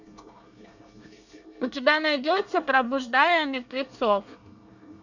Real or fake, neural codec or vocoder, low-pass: fake; codec, 24 kHz, 1 kbps, SNAC; 7.2 kHz